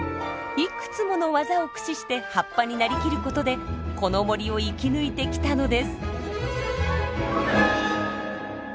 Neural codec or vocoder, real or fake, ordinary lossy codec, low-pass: none; real; none; none